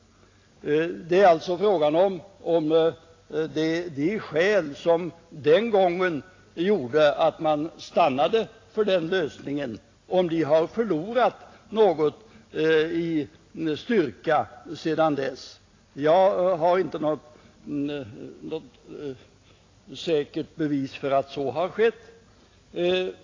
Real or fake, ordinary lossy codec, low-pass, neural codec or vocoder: real; AAC, 32 kbps; 7.2 kHz; none